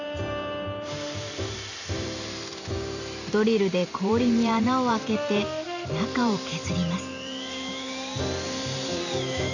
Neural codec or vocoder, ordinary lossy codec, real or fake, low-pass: vocoder, 44.1 kHz, 128 mel bands every 512 samples, BigVGAN v2; none; fake; 7.2 kHz